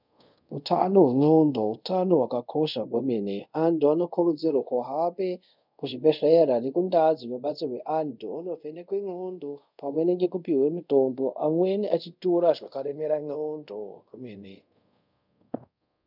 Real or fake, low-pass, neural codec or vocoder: fake; 5.4 kHz; codec, 24 kHz, 0.5 kbps, DualCodec